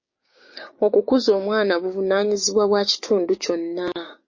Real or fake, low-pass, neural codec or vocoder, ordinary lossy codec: fake; 7.2 kHz; codec, 16 kHz, 6 kbps, DAC; MP3, 32 kbps